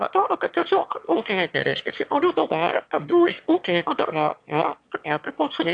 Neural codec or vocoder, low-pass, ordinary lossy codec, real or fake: autoencoder, 22.05 kHz, a latent of 192 numbers a frame, VITS, trained on one speaker; 9.9 kHz; AAC, 64 kbps; fake